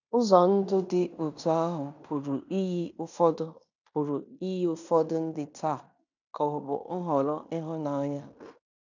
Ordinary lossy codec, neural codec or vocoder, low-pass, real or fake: none; codec, 16 kHz in and 24 kHz out, 0.9 kbps, LongCat-Audio-Codec, fine tuned four codebook decoder; 7.2 kHz; fake